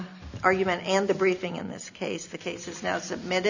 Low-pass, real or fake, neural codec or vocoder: 7.2 kHz; real; none